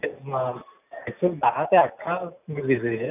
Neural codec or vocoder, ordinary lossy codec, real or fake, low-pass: none; none; real; 3.6 kHz